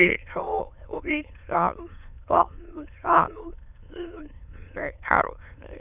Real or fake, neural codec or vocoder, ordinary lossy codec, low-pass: fake; autoencoder, 22.05 kHz, a latent of 192 numbers a frame, VITS, trained on many speakers; none; 3.6 kHz